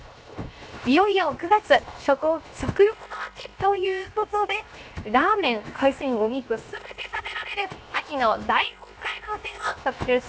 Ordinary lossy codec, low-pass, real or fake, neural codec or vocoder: none; none; fake; codec, 16 kHz, 0.7 kbps, FocalCodec